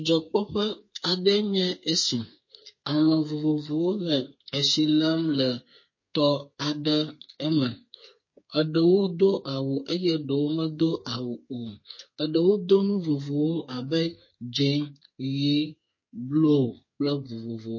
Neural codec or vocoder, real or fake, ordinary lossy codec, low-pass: codec, 32 kHz, 1.9 kbps, SNAC; fake; MP3, 32 kbps; 7.2 kHz